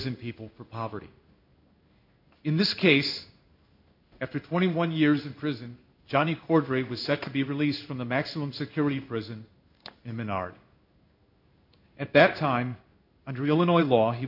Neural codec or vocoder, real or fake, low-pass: codec, 16 kHz in and 24 kHz out, 1 kbps, XY-Tokenizer; fake; 5.4 kHz